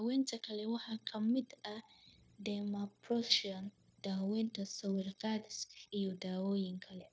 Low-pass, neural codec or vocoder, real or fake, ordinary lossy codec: none; codec, 16 kHz, 0.9 kbps, LongCat-Audio-Codec; fake; none